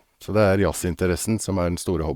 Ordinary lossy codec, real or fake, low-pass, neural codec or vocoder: Opus, 64 kbps; fake; 19.8 kHz; codec, 44.1 kHz, 7.8 kbps, Pupu-Codec